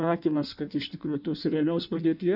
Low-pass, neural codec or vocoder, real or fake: 5.4 kHz; codec, 16 kHz in and 24 kHz out, 1.1 kbps, FireRedTTS-2 codec; fake